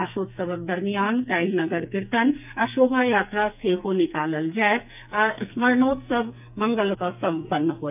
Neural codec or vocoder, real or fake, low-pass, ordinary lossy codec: codec, 44.1 kHz, 2.6 kbps, SNAC; fake; 3.6 kHz; none